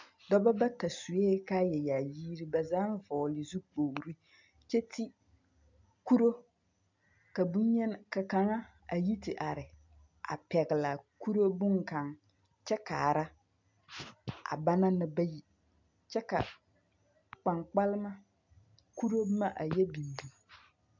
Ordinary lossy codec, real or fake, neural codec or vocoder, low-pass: MP3, 64 kbps; real; none; 7.2 kHz